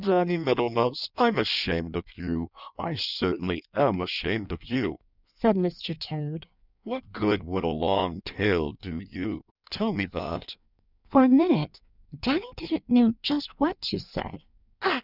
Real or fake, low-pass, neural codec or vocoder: fake; 5.4 kHz; codec, 16 kHz in and 24 kHz out, 1.1 kbps, FireRedTTS-2 codec